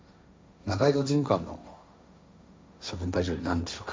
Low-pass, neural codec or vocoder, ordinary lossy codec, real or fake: none; codec, 16 kHz, 1.1 kbps, Voila-Tokenizer; none; fake